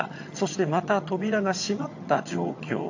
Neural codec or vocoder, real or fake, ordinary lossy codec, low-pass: vocoder, 22.05 kHz, 80 mel bands, HiFi-GAN; fake; AAC, 48 kbps; 7.2 kHz